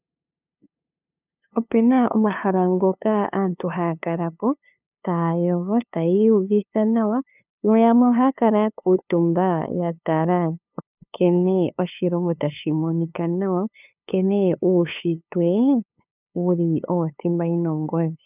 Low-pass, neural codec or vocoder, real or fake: 3.6 kHz; codec, 16 kHz, 2 kbps, FunCodec, trained on LibriTTS, 25 frames a second; fake